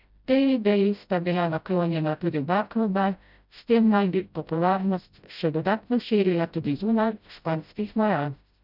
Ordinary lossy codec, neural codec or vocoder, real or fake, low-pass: none; codec, 16 kHz, 0.5 kbps, FreqCodec, smaller model; fake; 5.4 kHz